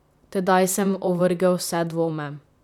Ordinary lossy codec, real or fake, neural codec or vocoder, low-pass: none; fake; vocoder, 44.1 kHz, 128 mel bands, Pupu-Vocoder; 19.8 kHz